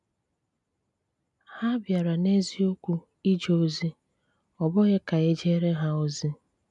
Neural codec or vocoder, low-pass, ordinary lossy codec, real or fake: none; 10.8 kHz; none; real